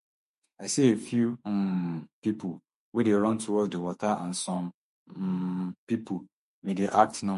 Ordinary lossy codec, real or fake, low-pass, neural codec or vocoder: MP3, 48 kbps; fake; 14.4 kHz; autoencoder, 48 kHz, 32 numbers a frame, DAC-VAE, trained on Japanese speech